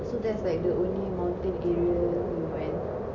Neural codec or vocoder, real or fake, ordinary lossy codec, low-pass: none; real; none; 7.2 kHz